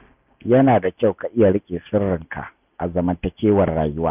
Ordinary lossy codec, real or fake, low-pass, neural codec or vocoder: none; real; 3.6 kHz; none